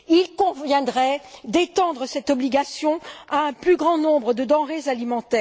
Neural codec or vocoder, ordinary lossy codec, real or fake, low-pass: none; none; real; none